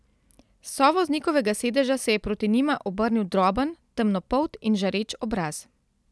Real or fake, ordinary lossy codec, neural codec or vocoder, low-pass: real; none; none; none